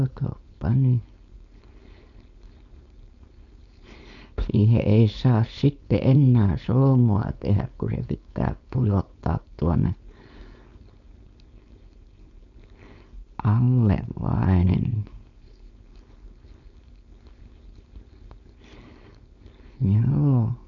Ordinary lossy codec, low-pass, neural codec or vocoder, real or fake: none; 7.2 kHz; codec, 16 kHz, 4.8 kbps, FACodec; fake